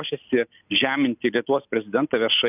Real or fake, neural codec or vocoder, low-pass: real; none; 3.6 kHz